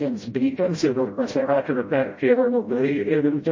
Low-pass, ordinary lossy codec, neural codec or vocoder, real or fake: 7.2 kHz; MP3, 32 kbps; codec, 16 kHz, 0.5 kbps, FreqCodec, smaller model; fake